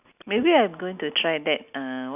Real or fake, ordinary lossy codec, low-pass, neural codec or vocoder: real; none; 3.6 kHz; none